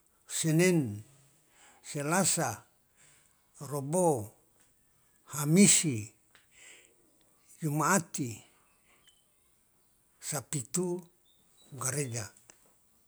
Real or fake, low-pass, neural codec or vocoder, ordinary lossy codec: real; none; none; none